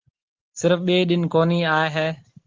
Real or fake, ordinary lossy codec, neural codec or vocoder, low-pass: real; Opus, 16 kbps; none; 7.2 kHz